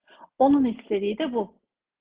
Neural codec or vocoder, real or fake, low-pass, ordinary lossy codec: none; real; 3.6 kHz; Opus, 16 kbps